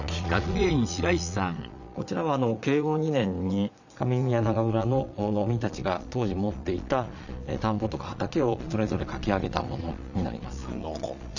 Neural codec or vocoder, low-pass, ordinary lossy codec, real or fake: vocoder, 22.05 kHz, 80 mel bands, Vocos; 7.2 kHz; none; fake